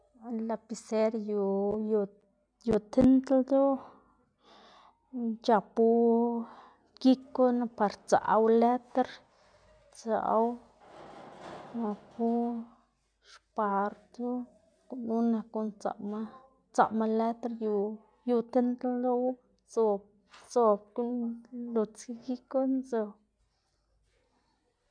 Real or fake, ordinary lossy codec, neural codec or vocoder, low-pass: real; MP3, 96 kbps; none; 9.9 kHz